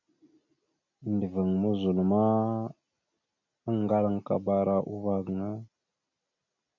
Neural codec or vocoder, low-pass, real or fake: none; 7.2 kHz; real